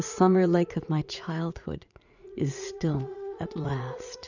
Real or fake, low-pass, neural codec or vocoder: fake; 7.2 kHz; vocoder, 44.1 kHz, 80 mel bands, Vocos